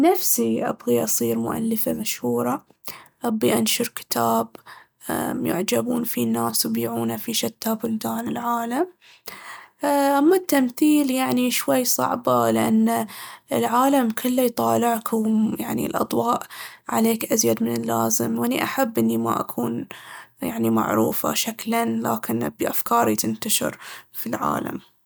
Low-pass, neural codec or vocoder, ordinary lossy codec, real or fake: none; none; none; real